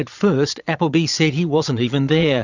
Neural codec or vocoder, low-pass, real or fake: vocoder, 22.05 kHz, 80 mel bands, Vocos; 7.2 kHz; fake